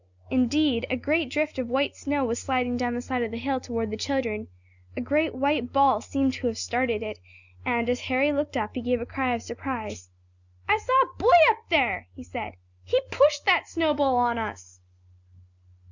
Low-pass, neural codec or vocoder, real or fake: 7.2 kHz; none; real